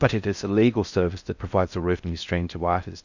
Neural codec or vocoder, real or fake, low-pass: codec, 16 kHz in and 24 kHz out, 0.6 kbps, FocalCodec, streaming, 4096 codes; fake; 7.2 kHz